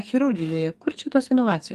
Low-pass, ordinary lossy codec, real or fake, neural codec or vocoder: 14.4 kHz; Opus, 32 kbps; fake; codec, 44.1 kHz, 2.6 kbps, SNAC